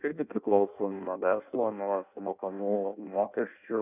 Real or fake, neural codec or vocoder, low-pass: fake; codec, 16 kHz in and 24 kHz out, 0.6 kbps, FireRedTTS-2 codec; 3.6 kHz